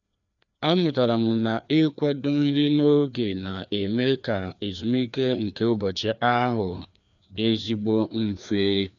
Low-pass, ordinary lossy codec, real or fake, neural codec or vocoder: 7.2 kHz; none; fake; codec, 16 kHz, 2 kbps, FreqCodec, larger model